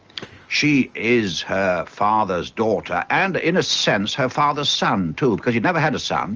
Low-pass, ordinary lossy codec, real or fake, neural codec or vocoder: 7.2 kHz; Opus, 24 kbps; real; none